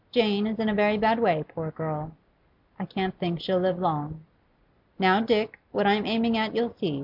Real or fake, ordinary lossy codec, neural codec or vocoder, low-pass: real; MP3, 48 kbps; none; 5.4 kHz